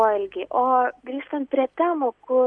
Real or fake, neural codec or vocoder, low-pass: real; none; 9.9 kHz